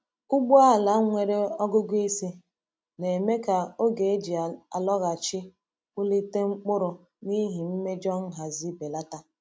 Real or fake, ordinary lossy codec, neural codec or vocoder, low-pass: real; none; none; none